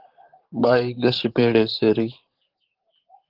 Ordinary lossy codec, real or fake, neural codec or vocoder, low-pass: Opus, 16 kbps; fake; vocoder, 44.1 kHz, 128 mel bands, Pupu-Vocoder; 5.4 kHz